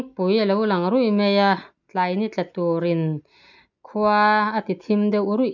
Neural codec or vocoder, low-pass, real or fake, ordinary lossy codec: none; 7.2 kHz; real; none